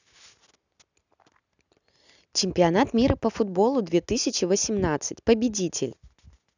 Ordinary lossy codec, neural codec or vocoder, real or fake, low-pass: none; none; real; 7.2 kHz